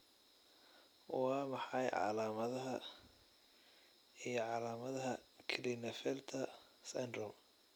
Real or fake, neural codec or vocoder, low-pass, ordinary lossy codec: real; none; none; none